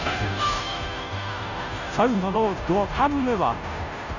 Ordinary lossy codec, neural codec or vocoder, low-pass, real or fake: none; codec, 16 kHz, 0.5 kbps, FunCodec, trained on Chinese and English, 25 frames a second; 7.2 kHz; fake